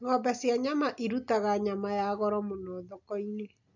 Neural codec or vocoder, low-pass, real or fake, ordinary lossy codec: none; 7.2 kHz; real; none